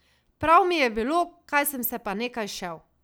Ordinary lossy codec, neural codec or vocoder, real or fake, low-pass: none; none; real; none